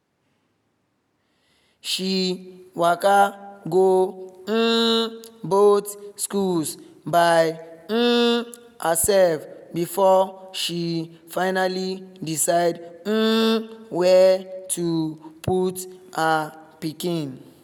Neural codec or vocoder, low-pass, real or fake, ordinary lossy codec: none; none; real; none